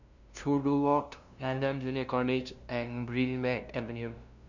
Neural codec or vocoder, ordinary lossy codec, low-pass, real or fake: codec, 16 kHz, 0.5 kbps, FunCodec, trained on LibriTTS, 25 frames a second; none; 7.2 kHz; fake